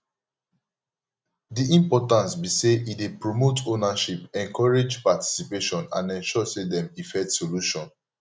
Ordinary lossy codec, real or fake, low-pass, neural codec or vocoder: none; real; none; none